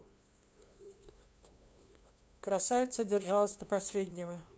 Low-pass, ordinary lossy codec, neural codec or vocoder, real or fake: none; none; codec, 16 kHz, 2 kbps, FunCodec, trained on LibriTTS, 25 frames a second; fake